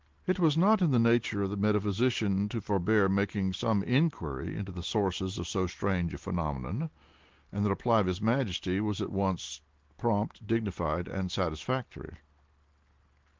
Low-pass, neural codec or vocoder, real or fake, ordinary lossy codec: 7.2 kHz; none; real; Opus, 16 kbps